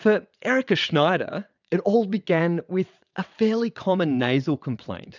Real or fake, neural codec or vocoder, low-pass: fake; vocoder, 22.05 kHz, 80 mel bands, WaveNeXt; 7.2 kHz